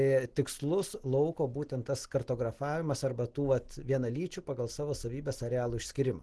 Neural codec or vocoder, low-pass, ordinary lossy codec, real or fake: none; 10.8 kHz; Opus, 16 kbps; real